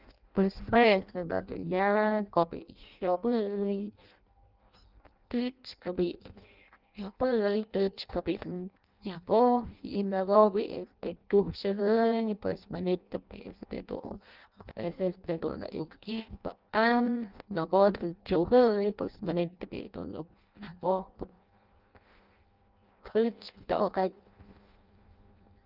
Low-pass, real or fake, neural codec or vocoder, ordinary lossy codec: 5.4 kHz; fake; codec, 16 kHz in and 24 kHz out, 0.6 kbps, FireRedTTS-2 codec; Opus, 24 kbps